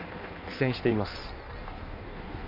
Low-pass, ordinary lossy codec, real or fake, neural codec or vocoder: 5.4 kHz; MP3, 48 kbps; fake; codec, 16 kHz in and 24 kHz out, 1.1 kbps, FireRedTTS-2 codec